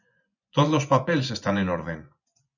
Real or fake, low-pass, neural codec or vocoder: fake; 7.2 kHz; vocoder, 24 kHz, 100 mel bands, Vocos